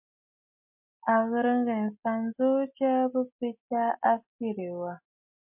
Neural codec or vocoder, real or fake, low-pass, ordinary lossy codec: none; real; 3.6 kHz; MP3, 32 kbps